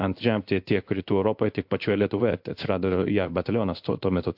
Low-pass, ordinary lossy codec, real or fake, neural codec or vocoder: 5.4 kHz; MP3, 48 kbps; fake; codec, 16 kHz in and 24 kHz out, 1 kbps, XY-Tokenizer